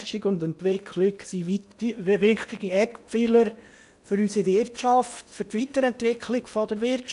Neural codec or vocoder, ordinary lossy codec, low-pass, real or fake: codec, 16 kHz in and 24 kHz out, 0.8 kbps, FocalCodec, streaming, 65536 codes; none; 10.8 kHz; fake